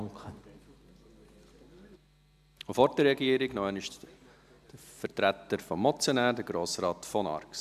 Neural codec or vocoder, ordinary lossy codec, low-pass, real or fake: none; none; 14.4 kHz; real